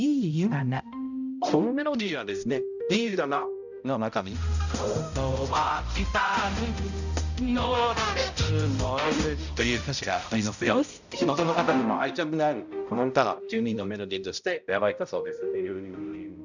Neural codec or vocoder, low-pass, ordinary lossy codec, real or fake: codec, 16 kHz, 0.5 kbps, X-Codec, HuBERT features, trained on balanced general audio; 7.2 kHz; none; fake